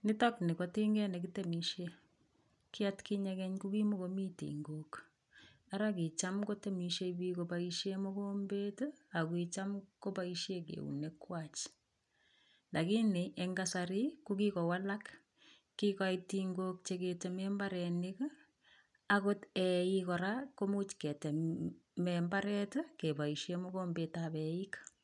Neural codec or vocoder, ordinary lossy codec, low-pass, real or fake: none; none; 10.8 kHz; real